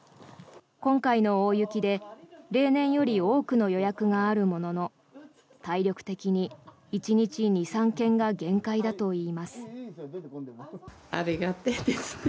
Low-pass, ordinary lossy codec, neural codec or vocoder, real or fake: none; none; none; real